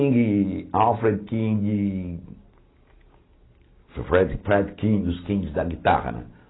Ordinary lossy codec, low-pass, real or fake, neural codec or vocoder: AAC, 16 kbps; 7.2 kHz; real; none